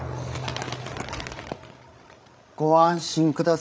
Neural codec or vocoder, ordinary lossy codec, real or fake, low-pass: codec, 16 kHz, 16 kbps, FreqCodec, larger model; none; fake; none